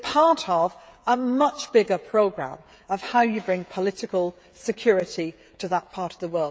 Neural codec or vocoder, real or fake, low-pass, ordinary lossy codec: codec, 16 kHz, 16 kbps, FreqCodec, smaller model; fake; none; none